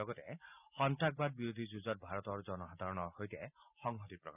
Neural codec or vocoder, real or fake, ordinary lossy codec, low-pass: none; real; none; 3.6 kHz